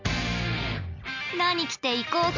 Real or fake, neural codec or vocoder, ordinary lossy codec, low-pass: real; none; MP3, 64 kbps; 7.2 kHz